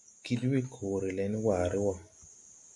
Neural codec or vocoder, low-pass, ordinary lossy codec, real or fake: none; 10.8 kHz; AAC, 64 kbps; real